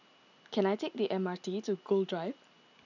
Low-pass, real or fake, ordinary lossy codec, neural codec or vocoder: 7.2 kHz; real; none; none